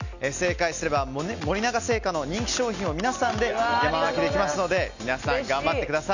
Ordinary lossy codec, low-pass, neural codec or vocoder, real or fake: none; 7.2 kHz; none; real